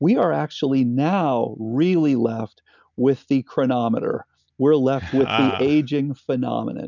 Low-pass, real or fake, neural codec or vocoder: 7.2 kHz; real; none